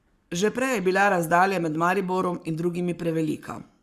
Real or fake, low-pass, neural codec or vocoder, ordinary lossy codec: fake; 14.4 kHz; codec, 44.1 kHz, 7.8 kbps, Pupu-Codec; Opus, 64 kbps